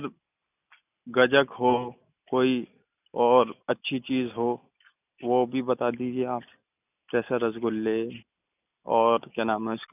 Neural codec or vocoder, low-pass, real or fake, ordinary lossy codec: none; 3.6 kHz; real; none